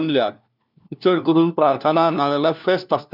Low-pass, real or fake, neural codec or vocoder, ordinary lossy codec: 5.4 kHz; fake; codec, 16 kHz, 1 kbps, FunCodec, trained on LibriTTS, 50 frames a second; none